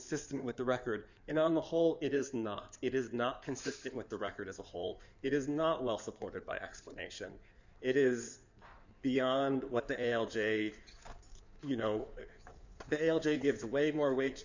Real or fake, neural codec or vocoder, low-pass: fake; codec, 16 kHz in and 24 kHz out, 2.2 kbps, FireRedTTS-2 codec; 7.2 kHz